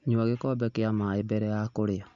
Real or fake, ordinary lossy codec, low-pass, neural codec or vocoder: real; Opus, 64 kbps; 7.2 kHz; none